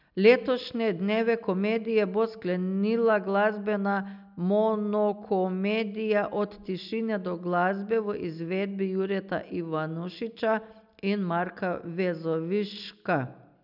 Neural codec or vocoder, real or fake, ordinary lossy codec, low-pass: none; real; none; 5.4 kHz